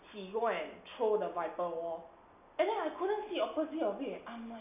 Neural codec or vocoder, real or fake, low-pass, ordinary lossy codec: none; real; 3.6 kHz; none